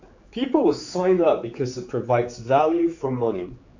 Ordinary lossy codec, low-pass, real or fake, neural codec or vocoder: AAC, 48 kbps; 7.2 kHz; fake; codec, 16 kHz, 4 kbps, X-Codec, HuBERT features, trained on general audio